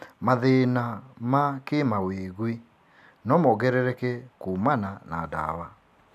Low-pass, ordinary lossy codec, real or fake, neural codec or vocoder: 14.4 kHz; none; real; none